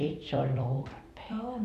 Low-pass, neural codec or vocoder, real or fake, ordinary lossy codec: 14.4 kHz; vocoder, 44.1 kHz, 128 mel bands every 256 samples, BigVGAN v2; fake; none